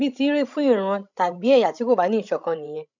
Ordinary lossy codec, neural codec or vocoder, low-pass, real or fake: none; codec, 16 kHz, 16 kbps, FreqCodec, larger model; 7.2 kHz; fake